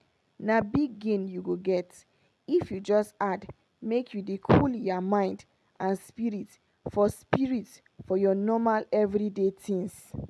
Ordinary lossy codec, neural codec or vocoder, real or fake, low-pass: none; none; real; none